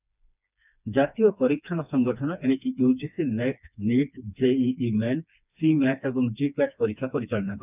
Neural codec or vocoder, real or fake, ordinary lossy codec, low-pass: codec, 16 kHz, 2 kbps, FreqCodec, smaller model; fake; none; 3.6 kHz